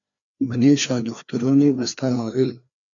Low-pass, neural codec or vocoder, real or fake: 7.2 kHz; codec, 16 kHz, 2 kbps, FreqCodec, larger model; fake